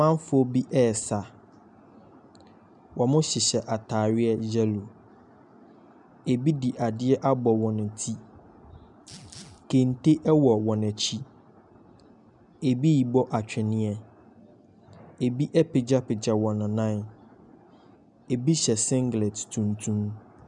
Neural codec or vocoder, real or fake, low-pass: none; real; 10.8 kHz